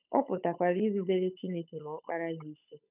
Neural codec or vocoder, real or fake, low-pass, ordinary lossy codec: codec, 16 kHz, 8 kbps, FunCodec, trained on Chinese and English, 25 frames a second; fake; 3.6 kHz; none